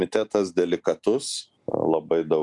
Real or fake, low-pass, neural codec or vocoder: real; 10.8 kHz; none